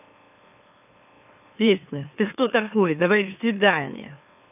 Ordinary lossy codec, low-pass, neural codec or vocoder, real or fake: none; 3.6 kHz; autoencoder, 44.1 kHz, a latent of 192 numbers a frame, MeloTTS; fake